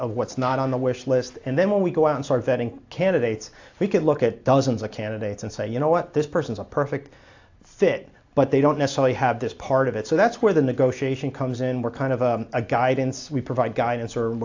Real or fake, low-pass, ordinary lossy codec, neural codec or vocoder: real; 7.2 kHz; AAC, 48 kbps; none